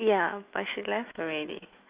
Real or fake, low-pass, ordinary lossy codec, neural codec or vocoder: fake; 3.6 kHz; Opus, 64 kbps; autoencoder, 48 kHz, 128 numbers a frame, DAC-VAE, trained on Japanese speech